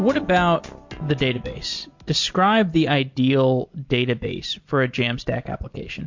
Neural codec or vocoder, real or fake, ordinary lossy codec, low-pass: none; real; MP3, 48 kbps; 7.2 kHz